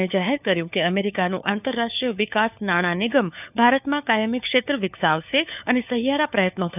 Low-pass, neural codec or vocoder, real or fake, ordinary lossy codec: 3.6 kHz; codec, 16 kHz, 4 kbps, FunCodec, trained on LibriTTS, 50 frames a second; fake; none